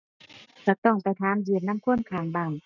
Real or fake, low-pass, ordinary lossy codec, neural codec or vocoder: real; 7.2 kHz; none; none